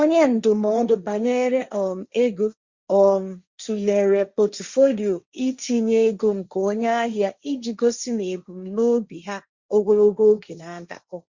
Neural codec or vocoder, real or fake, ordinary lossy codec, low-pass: codec, 16 kHz, 1.1 kbps, Voila-Tokenizer; fake; Opus, 64 kbps; 7.2 kHz